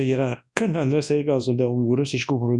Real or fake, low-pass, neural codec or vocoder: fake; 10.8 kHz; codec, 24 kHz, 0.9 kbps, WavTokenizer, large speech release